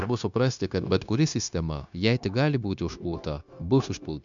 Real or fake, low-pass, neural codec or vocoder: fake; 7.2 kHz; codec, 16 kHz, 0.9 kbps, LongCat-Audio-Codec